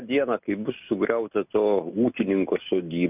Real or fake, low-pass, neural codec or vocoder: real; 3.6 kHz; none